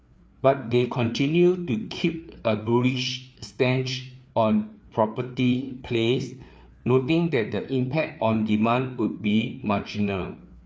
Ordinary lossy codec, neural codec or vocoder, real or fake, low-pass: none; codec, 16 kHz, 4 kbps, FreqCodec, larger model; fake; none